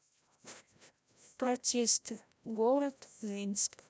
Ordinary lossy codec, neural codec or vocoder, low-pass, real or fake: none; codec, 16 kHz, 0.5 kbps, FreqCodec, larger model; none; fake